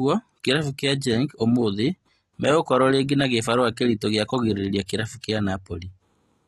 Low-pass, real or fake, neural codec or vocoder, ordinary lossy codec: 19.8 kHz; real; none; AAC, 32 kbps